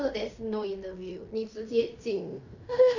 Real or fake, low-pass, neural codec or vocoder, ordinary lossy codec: fake; 7.2 kHz; codec, 16 kHz in and 24 kHz out, 0.9 kbps, LongCat-Audio-Codec, fine tuned four codebook decoder; none